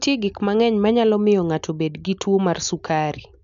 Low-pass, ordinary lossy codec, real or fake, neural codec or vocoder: 7.2 kHz; none; real; none